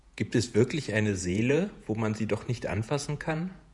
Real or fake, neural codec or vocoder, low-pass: fake; vocoder, 48 kHz, 128 mel bands, Vocos; 10.8 kHz